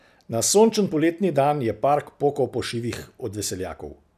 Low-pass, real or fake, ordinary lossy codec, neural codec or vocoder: 14.4 kHz; real; none; none